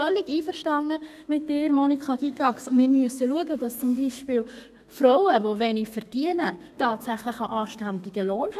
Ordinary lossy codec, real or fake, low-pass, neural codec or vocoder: none; fake; 14.4 kHz; codec, 32 kHz, 1.9 kbps, SNAC